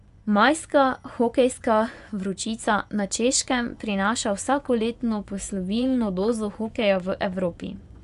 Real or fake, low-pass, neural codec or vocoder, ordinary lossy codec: fake; 10.8 kHz; vocoder, 24 kHz, 100 mel bands, Vocos; none